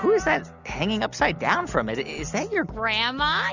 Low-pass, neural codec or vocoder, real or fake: 7.2 kHz; none; real